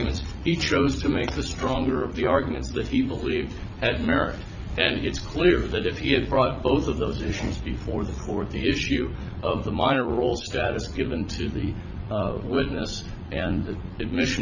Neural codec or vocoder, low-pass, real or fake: vocoder, 44.1 kHz, 80 mel bands, Vocos; 7.2 kHz; fake